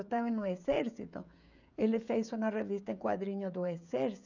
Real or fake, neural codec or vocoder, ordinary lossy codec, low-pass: fake; codec, 16 kHz, 16 kbps, FreqCodec, smaller model; none; 7.2 kHz